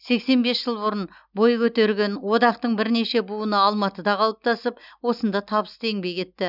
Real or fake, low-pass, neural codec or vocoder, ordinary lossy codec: real; 5.4 kHz; none; none